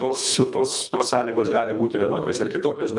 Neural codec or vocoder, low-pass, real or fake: codec, 24 kHz, 1.5 kbps, HILCodec; 10.8 kHz; fake